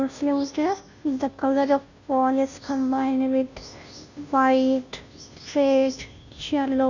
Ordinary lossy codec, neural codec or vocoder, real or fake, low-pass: none; codec, 16 kHz, 0.5 kbps, FunCodec, trained on Chinese and English, 25 frames a second; fake; 7.2 kHz